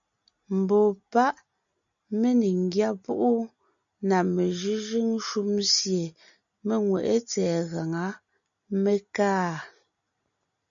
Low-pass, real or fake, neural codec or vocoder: 7.2 kHz; real; none